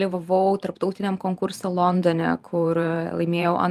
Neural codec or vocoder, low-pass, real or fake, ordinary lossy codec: vocoder, 44.1 kHz, 128 mel bands every 512 samples, BigVGAN v2; 14.4 kHz; fake; Opus, 32 kbps